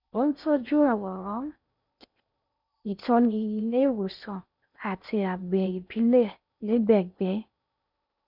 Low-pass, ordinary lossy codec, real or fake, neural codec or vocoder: 5.4 kHz; none; fake; codec, 16 kHz in and 24 kHz out, 0.6 kbps, FocalCodec, streaming, 4096 codes